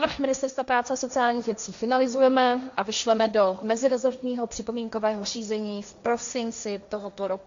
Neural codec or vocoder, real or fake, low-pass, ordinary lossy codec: codec, 16 kHz, 1.1 kbps, Voila-Tokenizer; fake; 7.2 kHz; MP3, 64 kbps